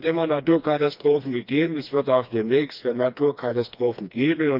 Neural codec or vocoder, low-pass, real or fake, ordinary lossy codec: codec, 16 kHz, 2 kbps, FreqCodec, smaller model; 5.4 kHz; fake; none